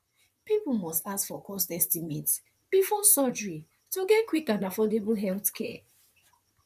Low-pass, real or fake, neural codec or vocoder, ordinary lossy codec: 14.4 kHz; fake; vocoder, 44.1 kHz, 128 mel bands, Pupu-Vocoder; none